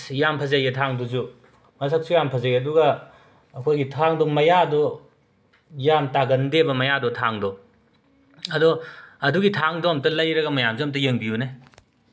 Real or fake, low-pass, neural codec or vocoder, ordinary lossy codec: real; none; none; none